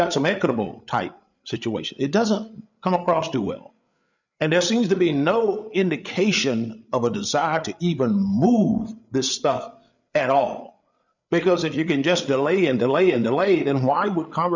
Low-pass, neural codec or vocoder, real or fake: 7.2 kHz; codec, 16 kHz, 8 kbps, FreqCodec, larger model; fake